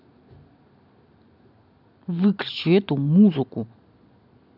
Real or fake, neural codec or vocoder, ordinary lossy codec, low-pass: real; none; none; 5.4 kHz